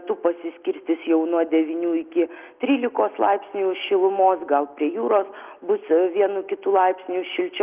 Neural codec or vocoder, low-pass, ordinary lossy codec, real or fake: none; 3.6 kHz; Opus, 32 kbps; real